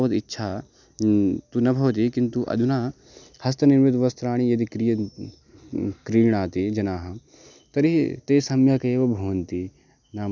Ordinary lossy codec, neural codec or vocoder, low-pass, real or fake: none; none; 7.2 kHz; real